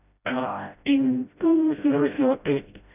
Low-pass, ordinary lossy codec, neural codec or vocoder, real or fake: 3.6 kHz; none; codec, 16 kHz, 0.5 kbps, FreqCodec, smaller model; fake